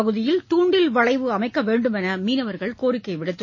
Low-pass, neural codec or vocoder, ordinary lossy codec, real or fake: 7.2 kHz; none; MP3, 32 kbps; real